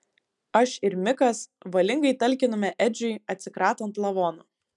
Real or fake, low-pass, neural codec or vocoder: real; 10.8 kHz; none